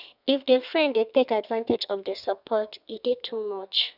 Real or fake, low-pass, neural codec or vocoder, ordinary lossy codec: fake; 5.4 kHz; codec, 32 kHz, 1.9 kbps, SNAC; none